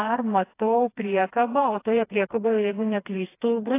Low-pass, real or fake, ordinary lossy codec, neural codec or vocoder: 3.6 kHz; fake; AAC, 24 kbps; codec, 16 kHz, 2 kbps, FreqCodec, smaller model